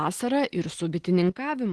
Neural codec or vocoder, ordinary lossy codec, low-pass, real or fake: none; Opus, 16 kbps; 10.8 kHz; real